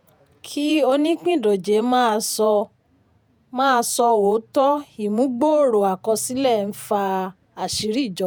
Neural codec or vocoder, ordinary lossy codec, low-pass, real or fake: vocoder, 48 kHz, 128 mel bands, Vocos; none; none; fake